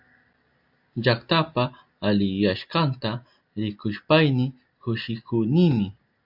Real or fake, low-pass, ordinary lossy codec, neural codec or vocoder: real; 5.4 kHz; AAC, 48 kbps; none